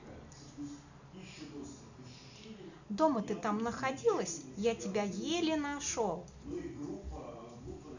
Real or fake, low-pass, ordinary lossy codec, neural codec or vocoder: real; 7.2 kHz; none; none